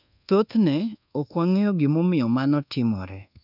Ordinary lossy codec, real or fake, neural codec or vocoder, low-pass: none; fake; codec, 24 kHz, 1.2 kbps, DualCodec; 5.4 kHz